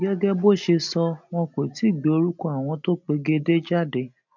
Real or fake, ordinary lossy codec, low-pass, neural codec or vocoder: real; none; 7.2 kHz; none